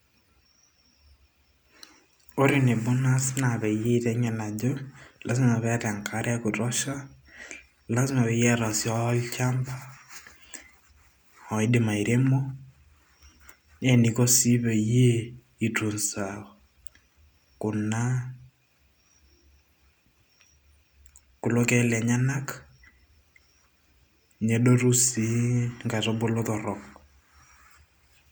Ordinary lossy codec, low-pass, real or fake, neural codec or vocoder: none; none; real; none